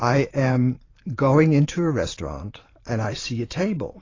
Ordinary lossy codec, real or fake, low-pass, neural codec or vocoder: AAC, 32 kbps; fake; 7.2 kHz; vocoder, 44.1 kHz, 128 mel bands every 512 samples, BigVGAN v2